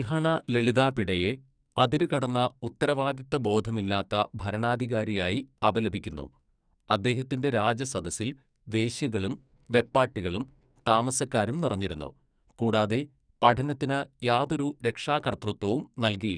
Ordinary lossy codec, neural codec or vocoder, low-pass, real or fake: none; codec, 44.1 kHz, 2.6 kbps, SNAC; 9.9 kHz; fake